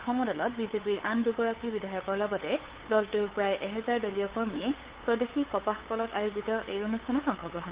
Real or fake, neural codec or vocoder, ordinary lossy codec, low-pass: fake; codec, 16 kHz, 8 kbps, FunCodec, trained on LibriTTS, 25 frames a second; Opus, 16 kbps; 3.6 kHz